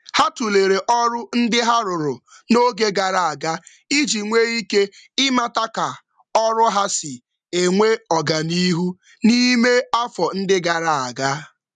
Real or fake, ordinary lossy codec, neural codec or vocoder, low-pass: real; none; none; 10.8 kHz